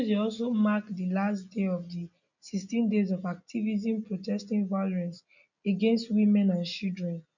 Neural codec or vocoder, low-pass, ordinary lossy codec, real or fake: none; 7.2 kHz; none; real